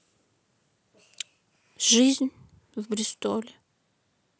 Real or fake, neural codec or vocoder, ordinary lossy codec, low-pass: real; none; none; none